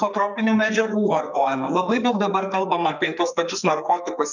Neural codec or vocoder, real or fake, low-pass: codec, 44.1 kHz, 2.6 kbps, SNAC; fake; 7.2 kHz